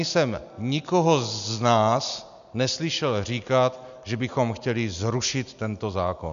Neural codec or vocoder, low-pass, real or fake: none; 7.2 kHz; real